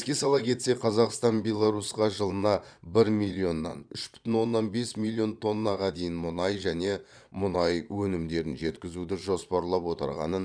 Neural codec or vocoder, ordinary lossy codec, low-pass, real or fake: vocoder, 22.05 kHz, 80 mel bands, Vocos; none; 9.9 kHz; fake